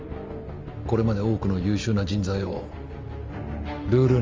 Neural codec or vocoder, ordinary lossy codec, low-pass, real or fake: none; Opus, 32 kbps; 7.2 kHz; real